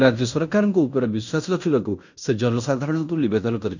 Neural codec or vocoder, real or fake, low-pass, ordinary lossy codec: codec, 16 kHz in and 24 kHz out, 0.9 kbps, LongCat-Audio-Codec, fine tuned four codebook decoder; fake; 7.2 kHz; none